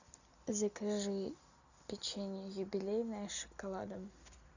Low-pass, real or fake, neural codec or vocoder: 7.2 kHz; real; none